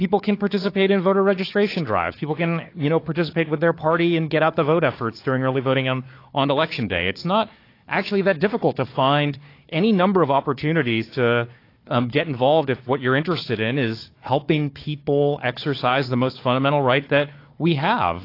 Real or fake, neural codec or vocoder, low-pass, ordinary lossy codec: fake; codec, 16 kHz, 4 kbps, FunCodec, trained on Chinese and English, 50 frames a second; 5.4 kHz; AAC, 32 kbps